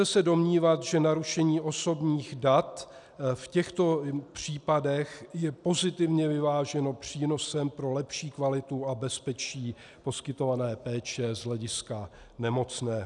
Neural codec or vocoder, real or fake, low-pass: none; real; 10.8 kHz